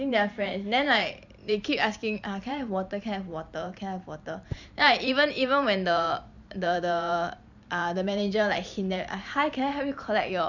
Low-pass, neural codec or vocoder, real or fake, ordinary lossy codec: 7.2 kHz; vocoder, 44.1 kHz, 128 mel bands every 512 samples, BigVGAN v2; fake; none